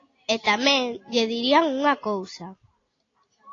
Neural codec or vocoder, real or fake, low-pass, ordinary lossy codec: none; real; 7.2 kHz; AAC, 32 kbps